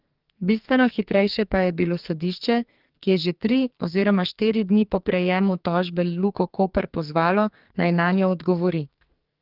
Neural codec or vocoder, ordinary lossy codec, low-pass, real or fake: codec, 44.1 kHz, 2.6 kbps, SNAC; Opus, 32 kbps; 5.4 kHz; fake